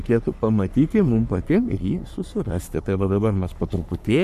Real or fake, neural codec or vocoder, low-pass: fake; codec, 44.1 kHz, 3.4 kbps, Pupu-Codec; 14.4 kHz